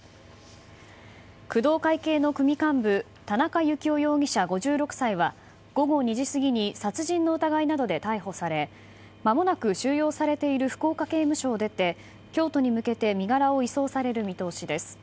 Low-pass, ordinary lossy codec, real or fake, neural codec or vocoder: none; none; real; none